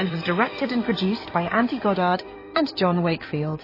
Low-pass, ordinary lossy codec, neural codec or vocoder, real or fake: 5.4 kHz; MP3, 24 kbps; codec, 16 kHz in and 24 kHz out, 2.2 kbps, FireRedTTS-2 codec; fake